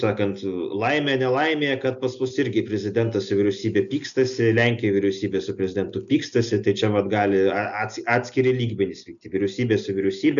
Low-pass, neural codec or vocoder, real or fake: 7.2 kHz; none; real